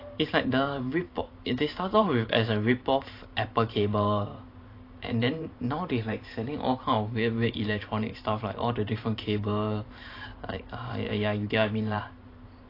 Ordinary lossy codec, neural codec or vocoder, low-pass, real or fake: AAC, 32 kbps; vocoder, 44.1 kHz, 128 mel bands every 512 samples, BigVGAN v2; 5.4 kHz; fake